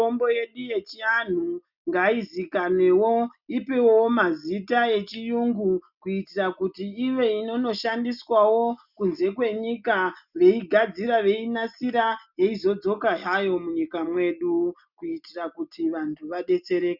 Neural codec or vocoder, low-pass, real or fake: none; 5.4 kHz; real